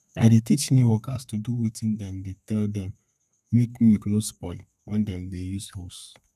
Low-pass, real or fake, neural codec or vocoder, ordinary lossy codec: 14.4 kHz; fake; codec, 32 kHz, 1.9 kbps, SNAC; none